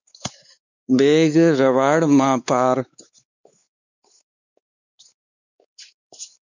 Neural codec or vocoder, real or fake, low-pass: codec, 16 kHz, 4 kbps, X-Codec, WavLM features, trained on Multilingual LibriSpeech; fake; 7.2 kHz